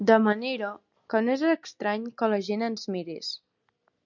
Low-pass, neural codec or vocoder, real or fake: 7.2 kHz; none; real